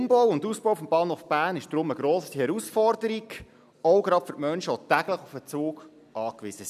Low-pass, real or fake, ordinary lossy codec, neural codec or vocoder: 14.4 kHz; real; none; none